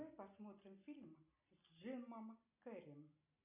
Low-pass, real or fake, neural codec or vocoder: 3.6 kHz; real; none